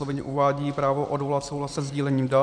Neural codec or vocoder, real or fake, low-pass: vocoder, 44.1 kHz, 128 mel bands every 512 samples, BigVGAN v2; fake; 9.9 kHz